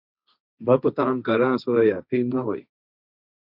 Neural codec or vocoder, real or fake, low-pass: codec, 16 kHz, 1.1 kbps, Voila-Tokenizer; fake; 5.4 kHz